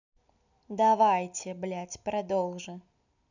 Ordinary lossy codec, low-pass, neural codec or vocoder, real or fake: none; 7.2 kHz; none; real